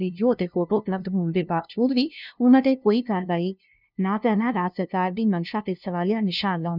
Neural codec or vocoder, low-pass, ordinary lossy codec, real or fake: codec, 16 kHz, 0.5 kbps, FunCodec, trained on LibriTTS, 25 frames a second; 5.4 kHz; none; fake